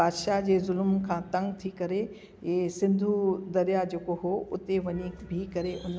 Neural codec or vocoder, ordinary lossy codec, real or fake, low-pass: none; none; real; none